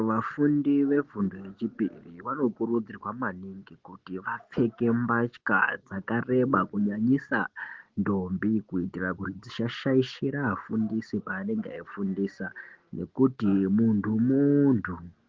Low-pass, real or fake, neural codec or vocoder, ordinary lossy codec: 7.2 kHz; real; none; Opus, 16 kbps